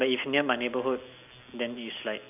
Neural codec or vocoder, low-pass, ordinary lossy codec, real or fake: none; 3.6 kHz; none; real